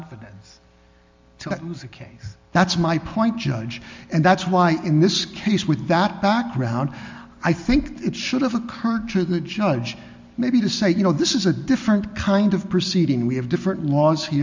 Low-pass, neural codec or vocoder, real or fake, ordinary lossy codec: 7.2 kHz; none; real; MP3, 64 kbps